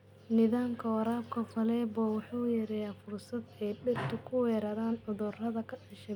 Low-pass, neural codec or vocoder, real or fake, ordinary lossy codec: 19.8 kHz; none; real; none